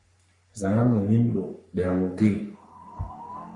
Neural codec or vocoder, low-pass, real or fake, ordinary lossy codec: codec, 44.1 kHz, 3.4 kbps, Pupu-Codec; 10.8 kHz; fake; MP3, 48 kbps